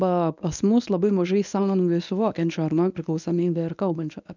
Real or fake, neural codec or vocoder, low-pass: fake; codec, 24 kHz, 0.9 kbps, WavTokenizer, medium speech release version 1; 7.2 kHz